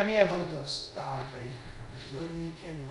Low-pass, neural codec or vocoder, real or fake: 10.8 kHz; codec, 24 kHz, 0.5 kbps, DualCodec; fake